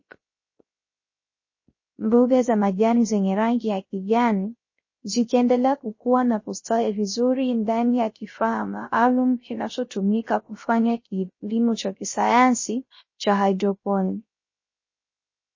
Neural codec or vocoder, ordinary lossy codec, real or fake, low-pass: codec, 16 kHz, 0.3 kbps, FocalCodec; MP3, 32 kbps; fake; 7.2 kHz